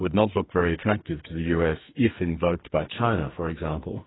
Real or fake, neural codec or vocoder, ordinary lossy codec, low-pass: fake; codec, 44.1 kHz, 2.6 kbps, SNAC; AAC, 16 kbps; 7.2 kHz